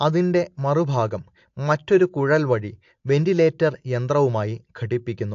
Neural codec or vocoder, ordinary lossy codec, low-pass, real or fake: none; AAC, 48 kbps; 7.2 kHz; real